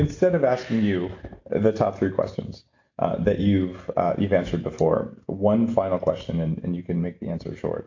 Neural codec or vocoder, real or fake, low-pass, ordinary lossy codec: none; real; 7.2 kHz; AAC, 48 kbps